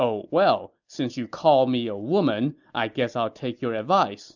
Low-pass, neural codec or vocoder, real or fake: 7.2 kHz; none; real